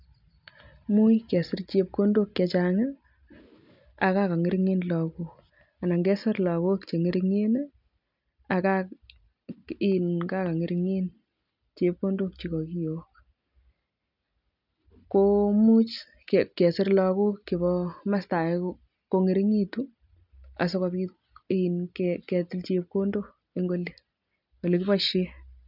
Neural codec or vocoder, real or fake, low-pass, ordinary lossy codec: none; real; 5.4 kHz; none